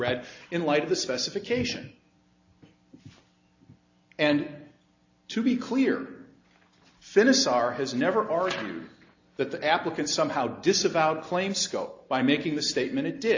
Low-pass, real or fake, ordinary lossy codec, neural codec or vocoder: 7.2 kHz; real; AAC, 48 kbps; none